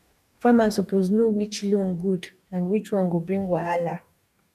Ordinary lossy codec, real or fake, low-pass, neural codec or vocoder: none; fake; 14.4 kHz; codec, 44.1 kHz, 2.6 kbps, DAC